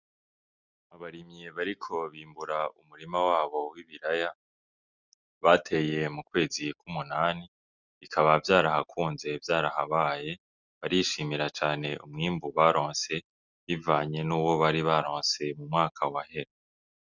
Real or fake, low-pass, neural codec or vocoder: real; 7.2 kHz; none